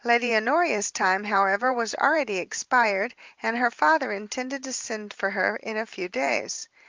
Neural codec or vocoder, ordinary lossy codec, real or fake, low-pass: vocoder, 44.1 kHz, 128 mel bands every 512 samples, BigVGAN v2; Opus, 24 kbps; fake; 7.2 kHz